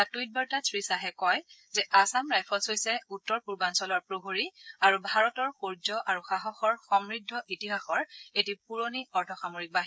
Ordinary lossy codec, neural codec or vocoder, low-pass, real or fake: none; codec, 16 kHz, 16 kbps, FreqCodec, smaller model; none; fake